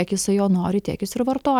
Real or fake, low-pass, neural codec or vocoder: real; 19.8 kHz; none